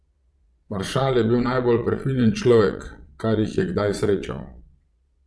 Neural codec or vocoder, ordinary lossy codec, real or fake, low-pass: vocoder, 22.05 kHz, 80 mel bands, Vocos; none; fake; none